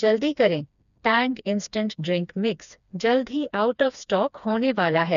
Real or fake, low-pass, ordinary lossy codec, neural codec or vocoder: fake; 7.2 kHz; none; codec, 16 kHz, 2 kbps, FreqCodec, smaller model